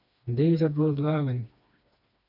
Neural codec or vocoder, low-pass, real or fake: codec, 16 kHz, 2 kbps, FreqCodec, smaller model; 5.4 kHz; fake